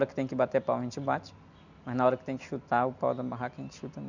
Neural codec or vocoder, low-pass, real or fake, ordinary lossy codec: none; 7.2 kHz; real; none